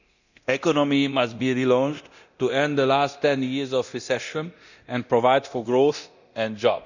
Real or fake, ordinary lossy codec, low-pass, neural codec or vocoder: fake; none; 7.2 kHz; codec, 24 kHz, 0.9 kbps, DualCodec